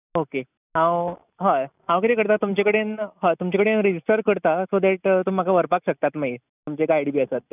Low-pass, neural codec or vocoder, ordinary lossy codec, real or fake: 3.6 kHz; none; none; real